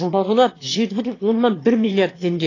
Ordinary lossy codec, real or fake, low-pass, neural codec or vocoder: AAC, 32 kbps; fake; 7.2 kHz; autoencoder, 22.05 kHz, a latent of 192 numbers a frame, VITS, trained on one speaker